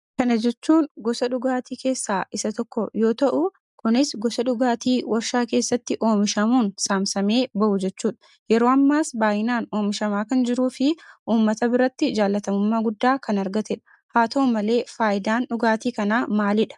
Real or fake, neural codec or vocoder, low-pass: real; none; 10.8 kHz